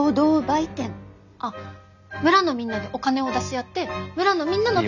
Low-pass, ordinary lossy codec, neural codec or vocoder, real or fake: 7.2 kHz; none; none; real